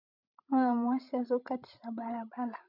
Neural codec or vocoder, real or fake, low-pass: codec, 16 kHz, 16 kbps, FreqCodec, larger model; fake; 5.4 kHz